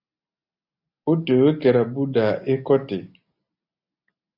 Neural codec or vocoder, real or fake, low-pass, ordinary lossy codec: none; real; 5.4 kHz; AAC, 48 kbps